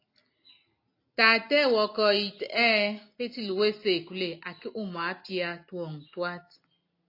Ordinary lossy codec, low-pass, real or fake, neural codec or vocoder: AAC, 32 kbps; 5.4 kHz; real; none